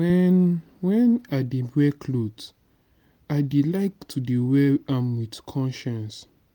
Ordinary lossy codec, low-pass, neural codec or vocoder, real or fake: MP3, 96 kbps; 19.8 kHz; none; real